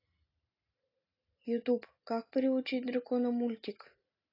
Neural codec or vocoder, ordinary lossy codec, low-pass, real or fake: none; AAC, 24 kbps; 5.4 kHz; real